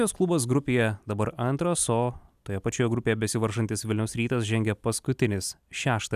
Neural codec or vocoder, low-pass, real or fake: none; 14.4 kHz; real